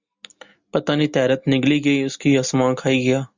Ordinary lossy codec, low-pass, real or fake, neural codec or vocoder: Opus, 64 kbps; 7.2 kHz; real; none